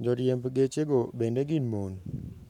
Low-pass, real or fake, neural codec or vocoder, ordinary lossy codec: 19.8 kHz; fake; codec, 44.1 kHz, 7.8 kbps, Pupu-Codec; none